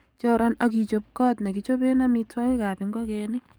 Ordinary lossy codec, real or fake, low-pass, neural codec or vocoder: none; fake; none; codec, 44.1 kHz, 7.8 kbps, DAC